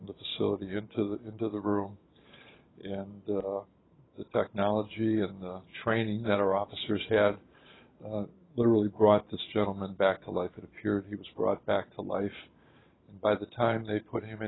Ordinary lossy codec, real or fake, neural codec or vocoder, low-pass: AAC, 16 kbps; fake; vocoder, 44.1 kHz, 128 mel bands every 512 samples, BigVGAN v2; 7.2 kHz